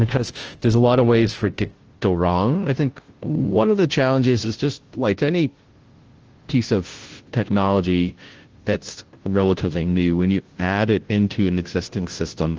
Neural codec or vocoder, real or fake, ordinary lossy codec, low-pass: codec, 16 kHz, 0.5 kbps, FunCodec, trained on Chinese and English, 25 frames a second; fake; Opus, 16 kbps; 7.2 kHz